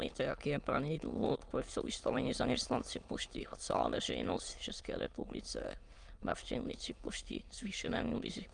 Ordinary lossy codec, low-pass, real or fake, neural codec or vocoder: Opus, 32 kbps; 9.9 kHz; fake; autoencoder, 22.05 kHz, a latent of 192 numbers a frame, VITS, trained on many speakers